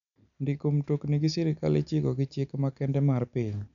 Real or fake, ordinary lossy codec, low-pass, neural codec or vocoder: real; none; 7.2 kHz; none